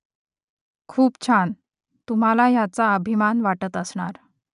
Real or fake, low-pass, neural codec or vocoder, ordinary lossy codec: real; 10.8 kHz; none; none